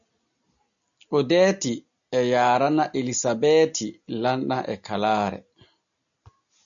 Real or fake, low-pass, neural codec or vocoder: real; 7.2 kHz; none